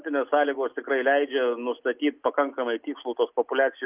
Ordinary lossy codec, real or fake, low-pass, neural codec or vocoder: Opus, 24 kbps; real; 3.6 kHz; none